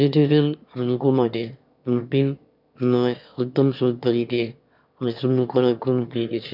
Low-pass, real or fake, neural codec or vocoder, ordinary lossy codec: 5.4 kHz; fake; autoencoder, 22.05 kHz, a latent of 192 numbers a frame, VITS, trained on one speaker; none